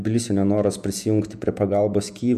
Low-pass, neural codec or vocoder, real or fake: 14.4 kHz; vocoder, 48 kHz, 128 mel bands, Vocos; fake